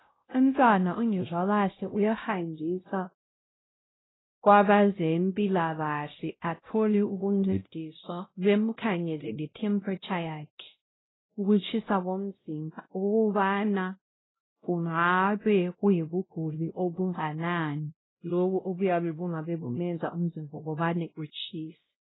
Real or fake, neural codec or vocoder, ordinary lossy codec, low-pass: fake; codec, 16 kHz, 0.5 kbps, X-Codec, WavLM features, trained on Multilingual LibriSpeech; AAC, 16 kbps; 7.2 kHz